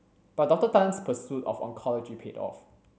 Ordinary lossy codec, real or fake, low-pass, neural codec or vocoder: none; real; none; none